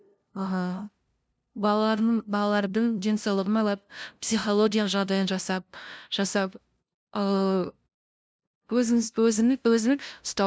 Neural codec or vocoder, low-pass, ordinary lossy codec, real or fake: codec, 16 kHz, 0.5 kbps, FunCodec, trained on LibriTTS, 25 frames a second; none; none; fake